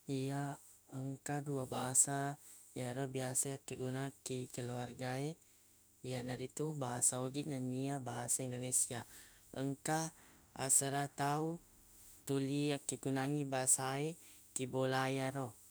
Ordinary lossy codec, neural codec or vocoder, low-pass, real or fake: none; autoencoder, 48 kHz, 32 numbers a frame, DAC-VAE, trained on Japanese speech; none; fake